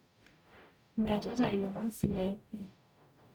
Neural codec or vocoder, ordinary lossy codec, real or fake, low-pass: codec, 44.1 kHz, 0.9 kbps, DAC; none; fake; none